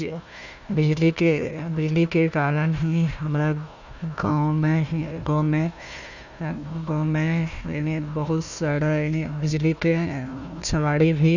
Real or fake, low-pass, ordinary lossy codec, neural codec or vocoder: fake; 7.2 kHz; none; codec, 16 kHz, 1 kbps, FunCodec, trained on Chinese and English, 50 frames a second